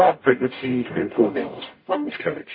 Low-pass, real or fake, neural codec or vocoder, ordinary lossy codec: 5.4 kHz; fake; codec, 44.1 kHz, 0.9 kbps, DAC; MP3, 24 kbps